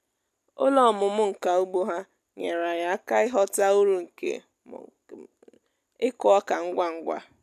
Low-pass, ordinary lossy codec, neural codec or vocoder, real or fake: 14.4 kHz; none; none; real